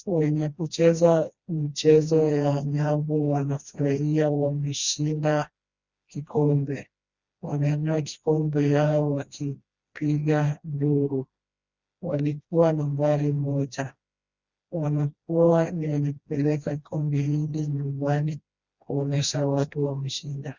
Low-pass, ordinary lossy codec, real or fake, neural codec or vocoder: 7.2 kHz; Opus, 64 kbps; fake; codec, 16 kHz, 1 kbps, FreqCodec, smaller model